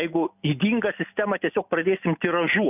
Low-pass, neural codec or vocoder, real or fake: 3.6 kHz; none; real